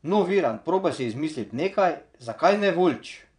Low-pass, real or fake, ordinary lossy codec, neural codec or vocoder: 9.9 kHz; fake; none; vocoder, 22.05 kHz, 80 mel bands, Vocos